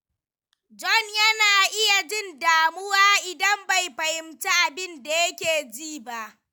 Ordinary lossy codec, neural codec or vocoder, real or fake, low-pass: none; none; real; none